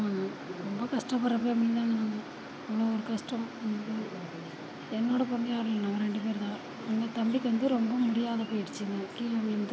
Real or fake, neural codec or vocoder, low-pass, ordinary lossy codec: real; none; none; none